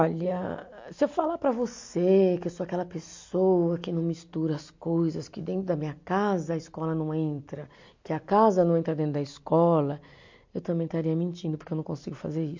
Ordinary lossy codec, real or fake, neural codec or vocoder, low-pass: MP3, 48 kbps; real; none; 7.2 kHz